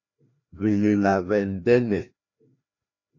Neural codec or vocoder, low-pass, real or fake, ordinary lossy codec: codec, 16 kHz, 1 kbps, FreqCodec, larger model; 7.2 kHz; fake; AAC, 48 kbps